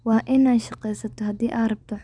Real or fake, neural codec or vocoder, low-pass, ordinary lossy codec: real; none; 9.9 kHz; none